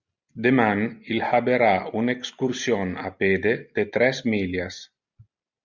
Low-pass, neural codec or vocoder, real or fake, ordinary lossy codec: 7.2 kHz; none; real; Opus, 64 kbps